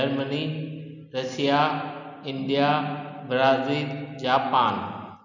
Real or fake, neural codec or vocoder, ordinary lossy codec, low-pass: real; none; none; 7.2 kHz